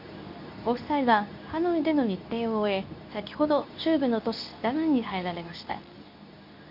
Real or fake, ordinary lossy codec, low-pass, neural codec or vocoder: fake; none; 5.4 kHz; codec, 24 kHz, 0.9 kbps, WavTokenizer, medium speech release version 2